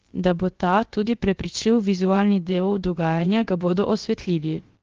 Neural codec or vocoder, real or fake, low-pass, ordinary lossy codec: codec, 16 kHz, about 1 kbps, DyCAST, with the encoder's durations; fake; 7.2 kHz; Opus, 16 kbps